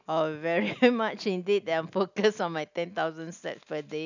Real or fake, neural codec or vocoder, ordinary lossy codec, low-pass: real; none; none; 7.2 kHz